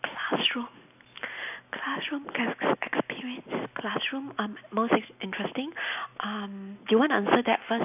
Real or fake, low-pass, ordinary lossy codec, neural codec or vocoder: real; 3.6 kHz; none; none